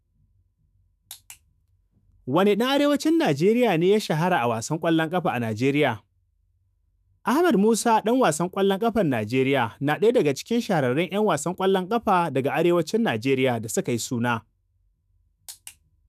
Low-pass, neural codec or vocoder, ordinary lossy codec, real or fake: 14.4 kHz; autoencoder, 48 kHz, 128 numbers a frame, DAC-VAE, trained on Japanese speech; none; fake